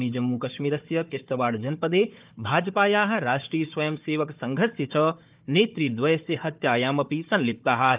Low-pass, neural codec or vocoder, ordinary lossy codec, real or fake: 3.6 kHz; codec, 16 kHz, 4 kbps, FunCodec, trained on Chinese and English, 50 frames a second; Opus, 24 kbps; fake